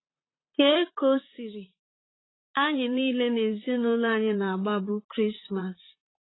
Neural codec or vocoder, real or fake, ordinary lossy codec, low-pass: vocoder, 44.1 kHz, 128 mel bands, Pupu-Vocoder; fake; AAC, 16 kbps; 7.2 kHz